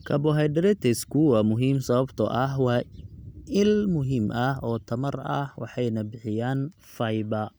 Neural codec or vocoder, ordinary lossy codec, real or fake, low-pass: none; none; real; none